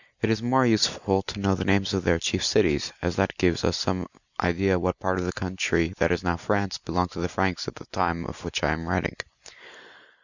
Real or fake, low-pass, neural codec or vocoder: real; 7.2 kHz; none